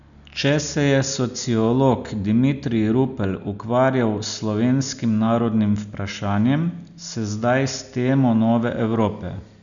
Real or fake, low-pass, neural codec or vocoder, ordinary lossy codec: real; 7.2 kHz; none; none